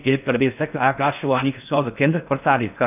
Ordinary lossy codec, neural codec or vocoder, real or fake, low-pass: none; codec, 16 kHz in and 24 kHz out, 0.6 kbps, FocalCodec, streaming, 4096 codes; fake; 3.6 kHz